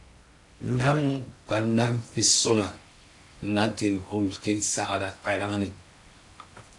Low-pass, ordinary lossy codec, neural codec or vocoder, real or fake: 10.8 kHz; AAC, 64 kbps; codec, 16 kHz in and 24 kHz out, 0.6 kbps, FocalCodec, streaming, 2048 codes; fake